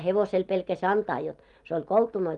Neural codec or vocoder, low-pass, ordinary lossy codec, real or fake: none; 10.8 kHz; Opus, 32 kbps; real